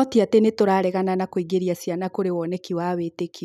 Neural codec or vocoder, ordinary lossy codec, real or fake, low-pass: none; none; real; 14.4 kHz